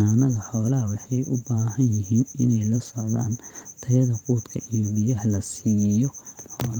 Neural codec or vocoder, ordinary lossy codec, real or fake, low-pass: vocoder, 44.1 kHz, 128 mel bands every 512 samples, BigVGAN v2; Opus, 32 kbps; fake; 19.8 kHz